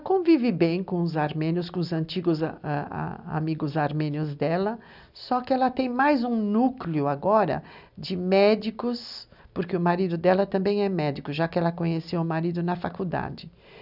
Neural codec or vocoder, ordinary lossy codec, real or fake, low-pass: none; none; real; 5.4 kHz